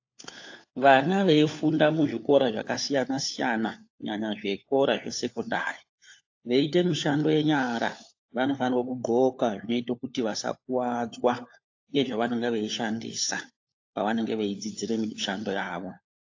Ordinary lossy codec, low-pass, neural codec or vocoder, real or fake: AAC, 48 kbps; 7.2 kHz; codec, 16 kHz, 4 kbps, FunCodec, trained on LibriTTS, 50 frames a second; fake